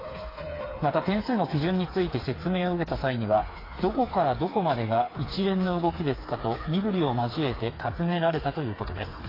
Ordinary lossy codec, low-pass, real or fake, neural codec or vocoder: AAC, 24 kbps; 5.4 kHz; fake; codec, 16 kHz, 4 kbps, FreqCodec, smaller model